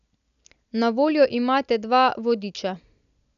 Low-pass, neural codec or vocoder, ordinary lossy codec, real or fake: 7.2 kHz; none; none; real